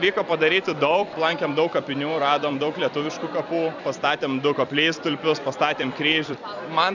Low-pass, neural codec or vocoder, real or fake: 7.2 kHz; vocoder, 44.1 kHz, 128 mel bands every 256 samples, BigVGAN v2; fake